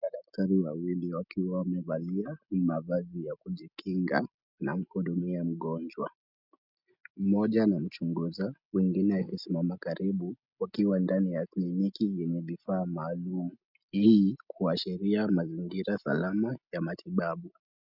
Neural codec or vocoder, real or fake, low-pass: none; real; 5.4 kHz